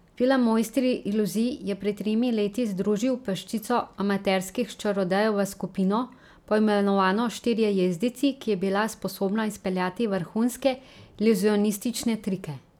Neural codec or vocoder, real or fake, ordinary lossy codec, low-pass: none; real; none; 19.8 kHz